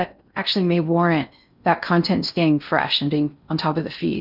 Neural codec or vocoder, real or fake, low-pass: codec, 16 kHz in and 24 kHz out, 0.6 kbps, FocalCodec, streaming, 4096 codes; fake; 5.4 kHz